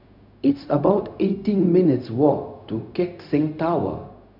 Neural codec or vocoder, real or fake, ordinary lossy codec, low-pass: codec, 16 kHz, 0.4 kbps, LongCat-Audio-Codec; fake; none; 5.4 kHz